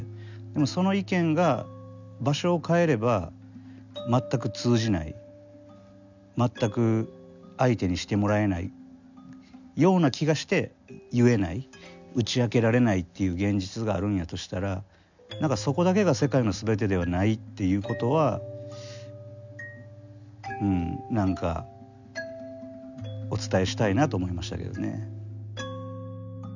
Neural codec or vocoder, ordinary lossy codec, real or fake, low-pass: none; none; real; 7.2 kHz